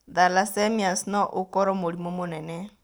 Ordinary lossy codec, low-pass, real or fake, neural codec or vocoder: none; none; fake; vocoder, 44.1 kHz, 128 mel bands every 512 samples, BigVGAN v2